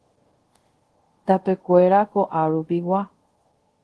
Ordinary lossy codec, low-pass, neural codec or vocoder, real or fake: Opus, 16 kbps; 10.8 kHz; codec, 24 kHz, 0.5 kbps, DualCodec; fake